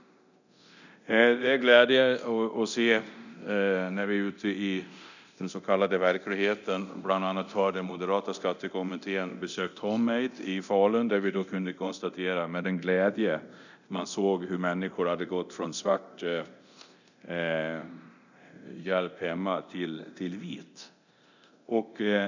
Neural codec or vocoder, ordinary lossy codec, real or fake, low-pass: codec, 24 kHz, 0.9 kbps, DualCodec; none; fake; 7.2 kHz